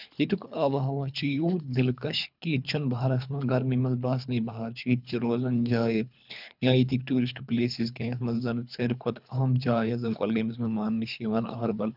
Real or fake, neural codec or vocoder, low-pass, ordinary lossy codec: fake; codec, 24 kHz, 3 kbps, HILCodec; 5.4 kHz; none